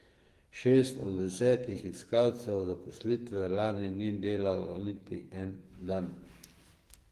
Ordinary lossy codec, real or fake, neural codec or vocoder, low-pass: Opus, 24 kbps; fake; codec, 44.1 kHz, 2.6 kbps, SNAC; 14.4 kHz